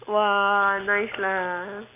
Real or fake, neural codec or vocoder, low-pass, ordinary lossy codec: real; none; 3.6 kHz; MP3, 24 kbps